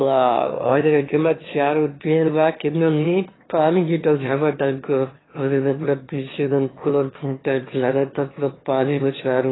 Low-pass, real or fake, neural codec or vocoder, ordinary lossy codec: 7.2 kHz; fake; autoencoder, 22.05 kHz, a latent of 192 numbers a frame, VITS, trained on one speaker; AAC, 16 kbps